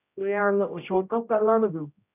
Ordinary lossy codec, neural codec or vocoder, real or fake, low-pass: none; codec, 16 kHz, 0.5 kbps, X-Codec, HuBERT features, trained on general audio; fake; 3.6 kHz